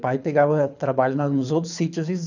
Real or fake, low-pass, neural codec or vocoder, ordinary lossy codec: fake; 7.2 kHz; codec, 24 kHz, 6 kbps, HILCodec; none